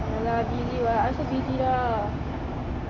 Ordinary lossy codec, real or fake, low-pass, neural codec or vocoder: AAC, 32 kbps; real; 7.2 kHz; none